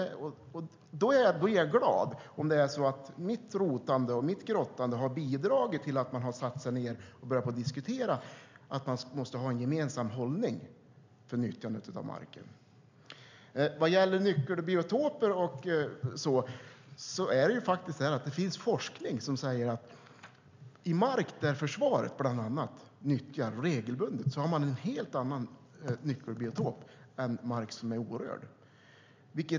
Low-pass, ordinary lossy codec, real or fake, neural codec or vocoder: 7.2 kHz; MP3, 64 kbps; real; none